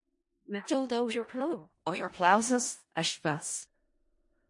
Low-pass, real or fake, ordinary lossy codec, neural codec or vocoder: 10.8 kHz; fake; MP3, 48 kbps; codec, 16 kHz in and 24 kHz out, 0.4 kbps, LongCat-Audio-Codec, four codebook decoder